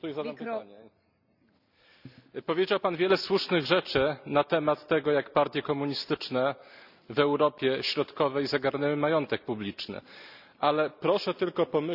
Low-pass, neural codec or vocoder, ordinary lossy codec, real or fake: 5.4 kHz; none; none; real